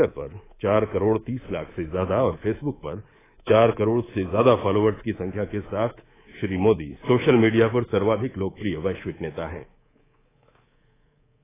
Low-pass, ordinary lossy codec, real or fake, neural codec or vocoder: 3.6 kHz; AAC, 16 kbps; fake; codec, 24 kHz, 3.1 kbps, DualCodec